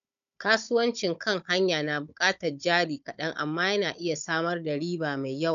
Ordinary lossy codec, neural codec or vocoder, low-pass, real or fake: none; codec, 16 kHz, 16 kbps, FunCodec, trained on Chinese and English, 50 frames a second; 7.2 kHz; fake